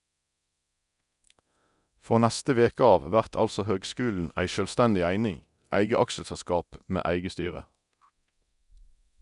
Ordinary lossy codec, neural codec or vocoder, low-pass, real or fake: none; codec, 24 kHz, 0.9 kbps, DualCodec; 10.8 kHz; fake